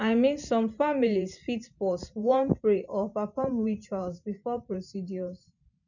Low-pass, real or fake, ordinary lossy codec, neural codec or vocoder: 7.2 kHz; fake; none; vocoder, 44.1 kHz, 128 mel bands every 512 samples, BigVGAN v2